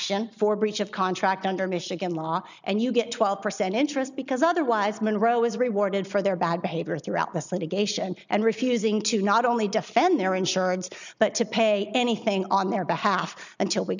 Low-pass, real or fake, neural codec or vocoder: 7.2 kHz; real; none